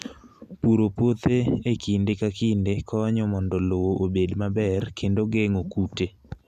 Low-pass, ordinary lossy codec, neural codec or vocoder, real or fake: 14.4 kHz; none; none; real